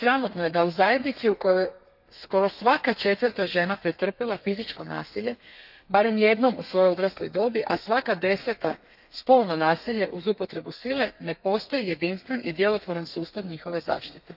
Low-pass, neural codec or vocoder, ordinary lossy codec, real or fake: 5.4 kHz; codec, 32 kHz, 1.9 kbps, SNAC; none; fake